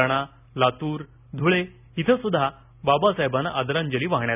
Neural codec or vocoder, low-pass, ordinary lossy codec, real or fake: none; 3.6 kHz; none; real